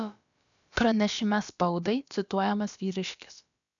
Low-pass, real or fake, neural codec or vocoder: 7.2 kHz; fake; codec, 16 kHz, about 1 kbps, DyCAST, with the encoder's durations